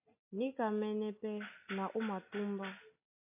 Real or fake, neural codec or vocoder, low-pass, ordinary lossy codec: real; none; 3.6 kHz; AAC, 32 kbps